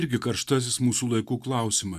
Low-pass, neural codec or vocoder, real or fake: 14.4 kHz; none; real